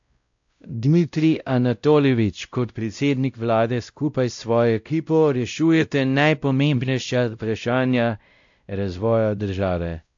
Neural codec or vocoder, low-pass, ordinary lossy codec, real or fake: codec, 16 kHz, 0.5 kbps, X-Codec, WavLM features, trained on Multilingual LibriSpeech; 7.2 kHz; AAC, 64 kbps; fake